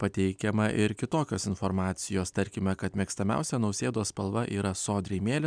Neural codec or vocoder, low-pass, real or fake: none; 9.9 kHz; real